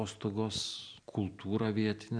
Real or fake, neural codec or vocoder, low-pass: real; none; 9.9 kHz